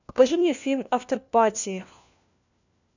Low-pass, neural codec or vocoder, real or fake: 7.2 kHz; codec, 16 kHz, 1 kbps, FunCodec, trained on LibriTTS, 50 frames a second; fake